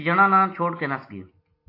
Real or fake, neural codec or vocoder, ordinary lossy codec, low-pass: real; none; AAC, 32 kbps; 5.4 kHz